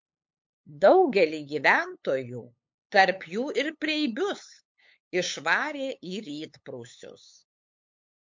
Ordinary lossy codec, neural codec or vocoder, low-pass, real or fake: MP3, 48 kbps; codec, 16 kHz, 8 kbps, FunCodec, trained on LibriTTS, 25 frames a second; 7.2 kHz; fake